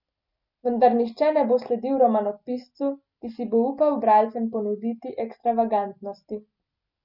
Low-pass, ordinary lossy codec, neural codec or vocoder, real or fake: 5.4 kHz; none; none; real